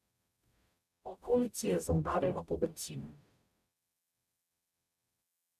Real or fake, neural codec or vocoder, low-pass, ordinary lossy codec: fake; codec, 44.1 kHz, 0.9 kbps, DAC; 14.4 kHz; none